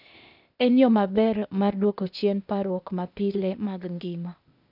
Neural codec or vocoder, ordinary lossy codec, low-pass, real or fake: codec, 16 kHz, 0.8 kbps, ZipCodec; none; 5.4 kHz; fake